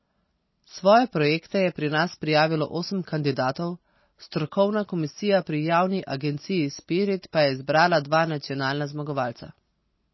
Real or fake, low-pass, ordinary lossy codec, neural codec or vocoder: real; 7.2 kHz; MP3, 24 kbps; none